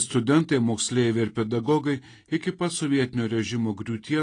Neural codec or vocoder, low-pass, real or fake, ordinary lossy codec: none; 9.9 kHz; real; AAC, 32 kbps